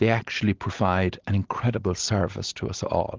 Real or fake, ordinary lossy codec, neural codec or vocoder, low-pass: real; Opus, 16 kbps; none; 7.2 kHz